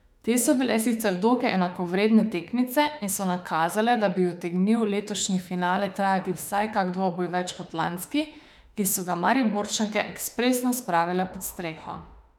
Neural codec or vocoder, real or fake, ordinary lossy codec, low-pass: autoencoder, 48 kHz, 32 numbers a frame, DAC-VAE, trained on Japanese speech; fake; none; 19.8 kHz